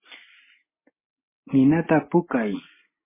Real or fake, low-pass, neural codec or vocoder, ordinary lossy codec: real; 3.6 kHz; none; MP3, 16 kbps